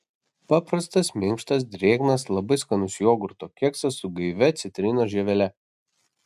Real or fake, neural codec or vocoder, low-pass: real; none; 14.4 kHz